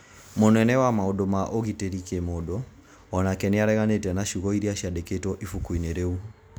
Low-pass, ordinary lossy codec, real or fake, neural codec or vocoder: none; none; real; none